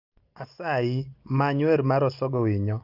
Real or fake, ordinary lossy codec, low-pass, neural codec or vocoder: real; Opus, 24 kbps; 5.4 kHz; none